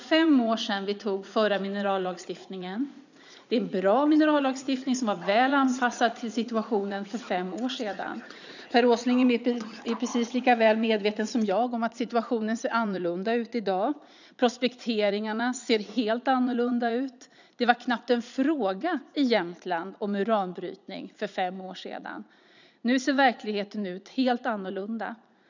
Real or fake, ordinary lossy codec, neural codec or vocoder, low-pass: fake; none; vocoder, 44.1 kHz, 80 mel bands, Vocos; 7.2 kHz